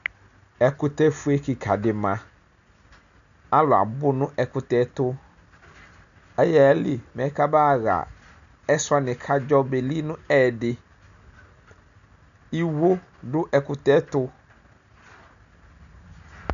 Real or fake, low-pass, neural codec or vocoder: real; 7.2 kHz; none